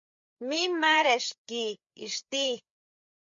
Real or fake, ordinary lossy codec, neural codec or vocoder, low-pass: fake; MP3, 48 kbps; codec, 16 kHz, 4 kbps, FreqCodec, larger model; 7.2 kHz